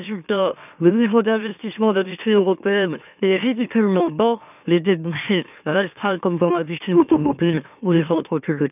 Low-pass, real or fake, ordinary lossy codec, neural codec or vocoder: 3.6 kHz; fake; none; autoencoder, 44.1 kHz, a latent of 192 numbers a frame, MeloTTS